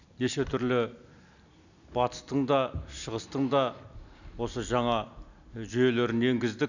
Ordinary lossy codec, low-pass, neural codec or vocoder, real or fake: none; 7.2 kHz; none; real